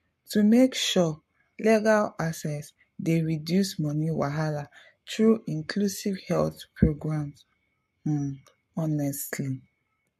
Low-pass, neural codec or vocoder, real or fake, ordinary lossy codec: 14.4 kHz; codec, 44.1 kHz, 7.8 kbps, Pupu-Codec; fake; MP3, 64 kbps